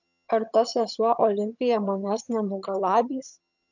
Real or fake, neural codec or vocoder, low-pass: fake; vocoder, 22.05 kHz, 80 mel bands, HiFi-GAN; 7.2 kHz